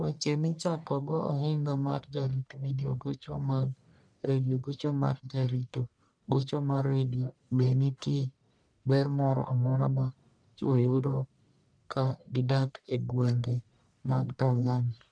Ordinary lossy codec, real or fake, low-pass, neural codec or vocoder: none; fake; 9.9 kHz; codec, 44.1 kHz, 1.7 kbps, Pupu-Codec